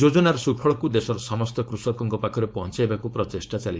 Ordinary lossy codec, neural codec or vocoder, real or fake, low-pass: none; codec, 16 kHz, 4.8 kbps, FACodec; fake; none